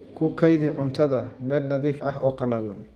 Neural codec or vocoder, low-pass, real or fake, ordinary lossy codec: codec, 32 kHz, 1.9 kbps, SNAC; 14.4 kHz; fake; Opus, 32 kbps